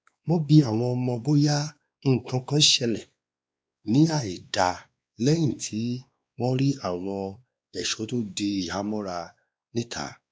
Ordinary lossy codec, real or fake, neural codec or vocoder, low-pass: none; fake; codec, 16 kHz, 2 kbps, X-Codec, WavLM features, trained on Multilingual LibriSpeech; none